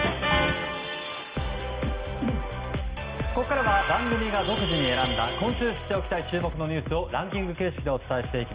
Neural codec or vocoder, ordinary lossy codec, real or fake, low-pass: none; Opus, 24 kbps; real; 3.6 kHz